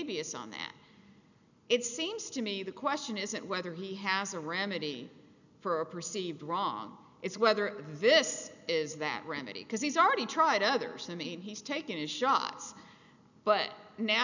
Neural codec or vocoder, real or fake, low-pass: none; real; 7.2 kHz